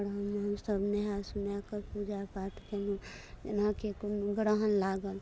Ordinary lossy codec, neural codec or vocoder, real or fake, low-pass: none; none; real; none